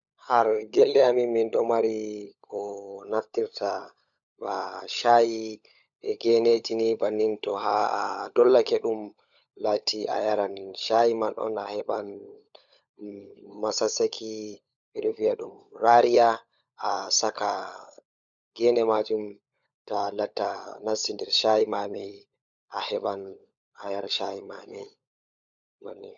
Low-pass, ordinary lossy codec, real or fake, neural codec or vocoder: 7.2 kHz; none; fake; codec, 16 kHz, 16 kbps, FunCodec, trained on LibriTTS, 50 frames a second